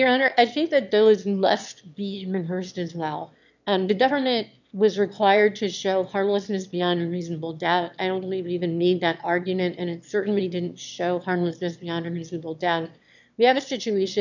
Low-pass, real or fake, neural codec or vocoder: 7.2 kHz; fake; autoencoder, 22.05 kHz, a latent of 192 numbers a frame, VITS, trained on one speaker